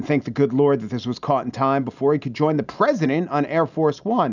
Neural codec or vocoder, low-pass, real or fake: none; 7.2 kHz; real